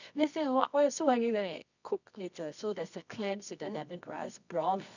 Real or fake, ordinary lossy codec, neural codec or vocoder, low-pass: fake; none; codec, 24 kHz, 0.9 kbps, WavTokenizer, medium music audio release; 7.2 kHz